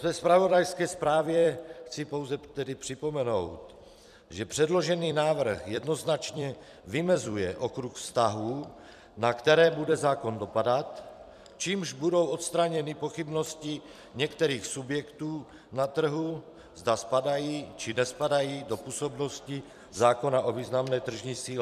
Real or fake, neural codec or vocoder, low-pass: fake; vocoder, 44.1 kHz, 128 mel bands every 256 samples, BigVGAN v2; 14.4 kHz